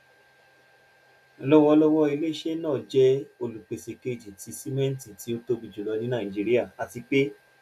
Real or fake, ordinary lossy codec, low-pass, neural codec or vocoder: real; none; 14.4 kHz; none